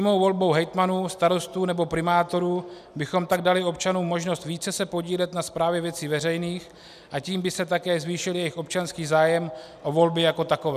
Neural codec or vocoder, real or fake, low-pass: none; real; 14.4 kHz